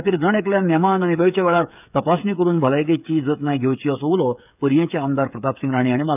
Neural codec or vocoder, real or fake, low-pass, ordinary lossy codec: codec, 16 kHz, 8 kbps, FreqCodec, smaller model; fake; 3.6 kHz; none